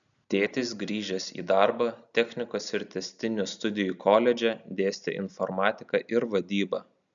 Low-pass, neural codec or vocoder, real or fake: 7.2 kHz; none; real